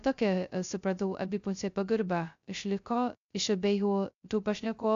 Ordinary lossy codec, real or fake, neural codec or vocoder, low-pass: AAC, 64 kbps; fake; codec, 16 kHz, 0.2 kbps, FocalCodec; 7.2 kHz